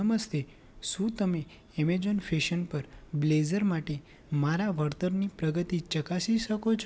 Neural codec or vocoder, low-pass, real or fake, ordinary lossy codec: none; none; real; none